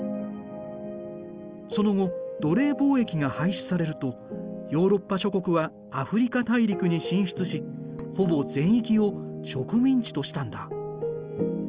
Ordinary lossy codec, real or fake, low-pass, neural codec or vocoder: Opus, 24 kbps; real; 3.6 kHz; none